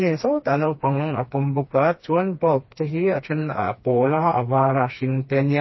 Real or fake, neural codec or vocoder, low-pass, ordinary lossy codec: fake; codec, 16 kHz, 2 kbps, FreqCodec, smaller model; 7.2 kHz; MP3, 24 kbps